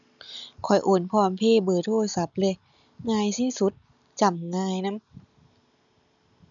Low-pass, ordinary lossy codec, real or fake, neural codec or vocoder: 7.2 kHz; none; real; none